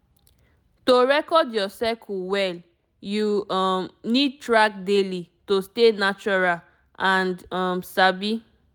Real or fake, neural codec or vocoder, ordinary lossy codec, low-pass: real; none; none; 19.8 kHz